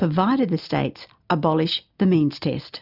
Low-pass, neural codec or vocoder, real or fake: 5.4 kHz; none; real